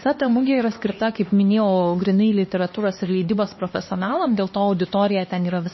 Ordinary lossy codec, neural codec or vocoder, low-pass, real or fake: MP3, 24 kbps; codec, 16 kHz, 2 kbps, X-Codec, HuBERT features, trained on LibriSpeech; 7.2 kHz; fake